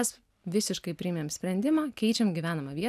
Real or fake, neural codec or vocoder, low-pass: real; none; 14.4 kHz